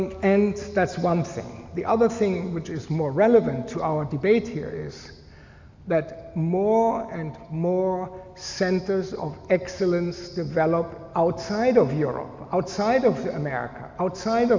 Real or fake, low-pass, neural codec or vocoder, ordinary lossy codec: real; 7.2 kHz; none; MP3, 64 kbps